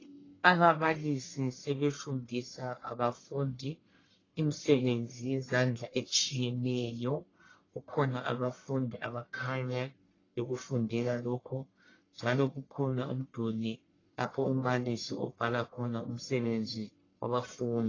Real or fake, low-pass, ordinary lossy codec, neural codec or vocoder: fake; 7.2 kHz; AAC, 32 kbps; codec, 44.1 kHz, 1.7 kbps, Pupu-Codec